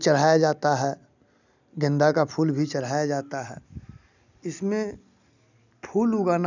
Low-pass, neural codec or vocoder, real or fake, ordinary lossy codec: 7.2 kHz; none; real; none